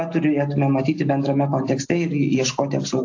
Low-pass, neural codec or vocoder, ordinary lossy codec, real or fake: 7.2 kHz; none; AAC, 48 kbps; real